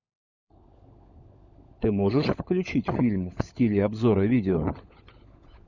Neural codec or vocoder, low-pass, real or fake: codec, 16 kHz, 16 kbps, FunCodec, trained on LibriTTS, 50 frames a second; 7.2 kHz; fake